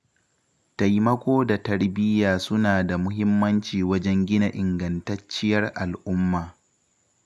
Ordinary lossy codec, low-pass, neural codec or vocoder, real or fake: none; none; none; real